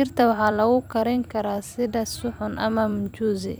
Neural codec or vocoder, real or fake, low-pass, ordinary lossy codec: vocoder, 44.1 kHz, 128 mel bands every 256 samples, BigVGAN v2; fake; none; none